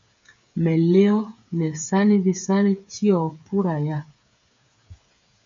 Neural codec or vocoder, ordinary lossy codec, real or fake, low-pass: codec, 16 kHz, 8 kbps, FreqCodec, smaller model; MP3, 48 kbps; fake; 7.2 kHz